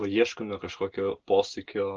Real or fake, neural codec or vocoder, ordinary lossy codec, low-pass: real; none; Opus, 16 kbps; 7.2 kHz